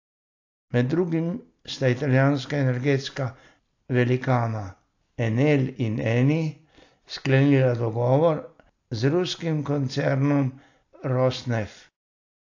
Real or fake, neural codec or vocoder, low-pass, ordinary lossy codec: real; none; 7.2 kHz; AAC, 48 kbps